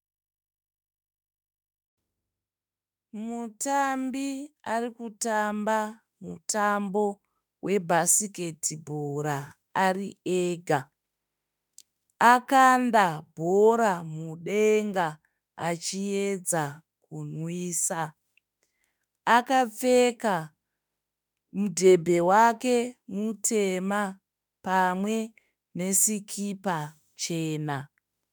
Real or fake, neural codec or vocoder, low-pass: fake; autoencoder, 48 kHz, 32 numbers a frame, DAC-VAE, trained on Japanese speech; 19.8 kHz